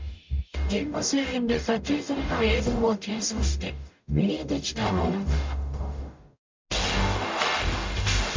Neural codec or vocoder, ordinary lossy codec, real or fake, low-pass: codec, 44.1 kHz, 0.9 kbps, DAC; MP3, 64 kbps; fake; 7.2 kHz